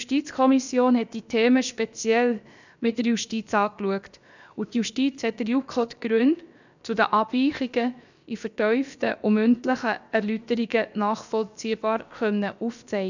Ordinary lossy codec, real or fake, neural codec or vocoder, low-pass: none; fake; codec, 16 kHz, about 1 kbps, DyCAST, with the encoder's durations; 7.2 kHz